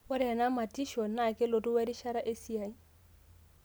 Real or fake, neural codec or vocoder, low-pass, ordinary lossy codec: real; none; none; none